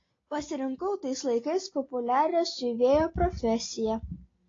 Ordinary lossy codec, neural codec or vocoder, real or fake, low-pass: AAC, 32 kbps; none; real; 7.2 kHz